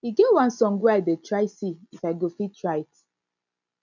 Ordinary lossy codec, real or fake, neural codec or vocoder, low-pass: none; real; none; 7.2 kHz